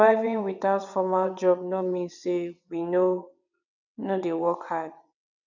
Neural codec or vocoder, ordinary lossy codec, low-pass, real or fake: vocoder, 22.05 kHz, 80 mel bands, WaveNeXt; none; 7.2 kHz; fake